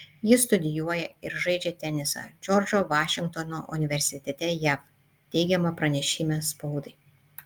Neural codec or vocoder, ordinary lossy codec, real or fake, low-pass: none; Opus, 32 kbps; real; 19.8 kHz